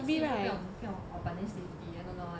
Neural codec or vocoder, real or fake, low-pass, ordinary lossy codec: none; real; none; none